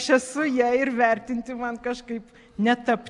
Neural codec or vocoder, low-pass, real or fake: none; 10.8 kHz; real